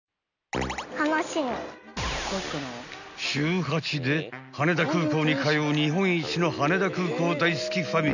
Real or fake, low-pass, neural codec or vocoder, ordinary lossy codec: real; 7.2 kHz; none; none